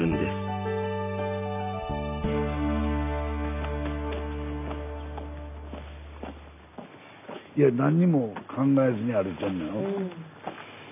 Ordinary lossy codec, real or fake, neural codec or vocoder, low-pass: none; real; none; 3.6 kHz